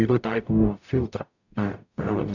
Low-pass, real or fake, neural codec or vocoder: 7.2 kHz; fake; codec, 44.1 kHz, 0.9 kbps, DAC